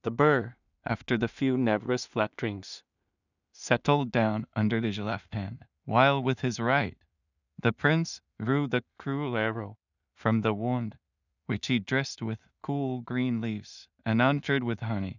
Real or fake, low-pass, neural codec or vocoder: fake; 7.2 kHz; codec, 16 kHz in and 24 kHz out, 0.4 kbps, LongCat-Audio-Codec, two codebook decoder